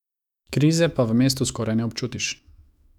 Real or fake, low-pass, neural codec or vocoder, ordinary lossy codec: fake; 19.8 kHz; autoencoder, 48 kHz, 128 numbers a frame, DAC-VAE, trained on Japanese speech; none